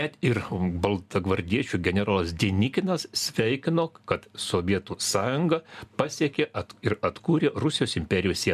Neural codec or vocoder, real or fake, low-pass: none; real; 14.4 kHz